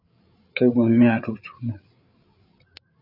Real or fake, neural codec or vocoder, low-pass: fake; codec, 16 kHz, 8 kbps, FreqCodec, larger model; 5.4 kHz